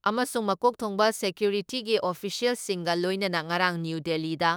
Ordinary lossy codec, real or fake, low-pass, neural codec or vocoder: none; fake; none; autoencoder, 48 kHz, 32 numbers a frame, DAC-VAE, trained on Japanese speech